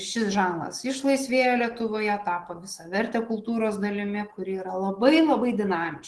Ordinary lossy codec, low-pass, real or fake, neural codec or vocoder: Opus, 16 kbps; 10.8 kHz; real; none